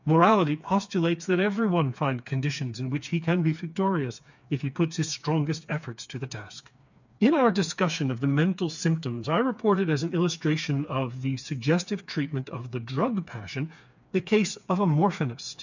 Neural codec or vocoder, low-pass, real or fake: codec, 16 kHz, 4 kbps, FreqCodec, smaller model; 7.2 kHz; fake